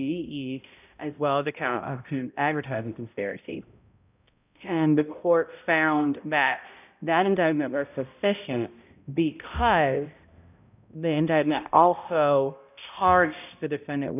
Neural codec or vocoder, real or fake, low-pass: codec, 16 kHz, 0.5 kbps, X-Codec, HuBERT features, trained on balanced general audio; fake; 3.6 kHz